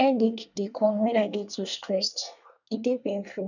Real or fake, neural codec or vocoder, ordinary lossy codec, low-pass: fake; codec, 24 kHz, 1 kbps, SNAC; none; 7.2 kHz